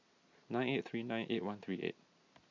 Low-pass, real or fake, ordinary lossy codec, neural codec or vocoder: 7.2 kHz; real; MP3, 48 kbps; none